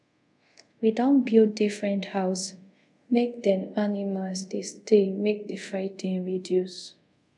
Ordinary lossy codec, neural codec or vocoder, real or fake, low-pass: MP3, 96 kbps; codec, 24 kHz, 0.5 kbps, DualCodec; fake; 10.8 kHz